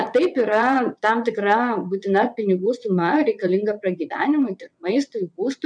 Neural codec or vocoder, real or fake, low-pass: vocoder, 22.05 kHz, 80 mel bands, WaveNeXt; fake; 9.9 kHz